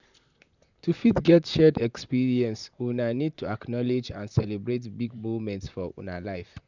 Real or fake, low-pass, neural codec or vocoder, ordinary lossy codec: fake; 7.2 kHz; vocoder, 44.1 kHz, 128 mel bands, Pupu-Vocoder; none